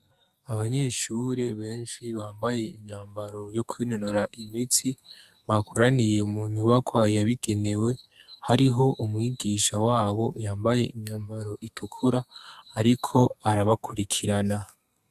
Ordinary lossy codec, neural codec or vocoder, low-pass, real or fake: Opus, 64 kbps; codec, 44.1 kHz, 2.6 kbps, SNAC; 14.4 kHz; fake